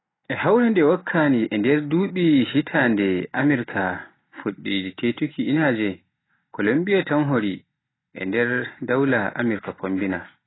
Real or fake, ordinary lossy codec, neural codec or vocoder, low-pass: real; AAC, 16 kbps; none; 7.2 kHz